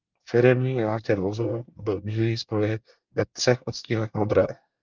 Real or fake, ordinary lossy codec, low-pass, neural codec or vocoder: fake; Opus, 32 kbps; 7.2 kHz; codec, 24 kHz, 1 kbps, SNAC